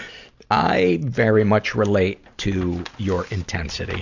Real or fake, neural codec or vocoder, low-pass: real; none; 7.2 kHz